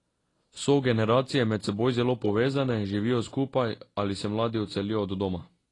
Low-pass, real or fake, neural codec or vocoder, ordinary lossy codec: 10.8 kHz; real; none; AAC, 32 kbps